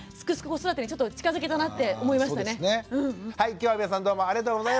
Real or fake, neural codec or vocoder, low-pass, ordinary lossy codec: real; none; none; none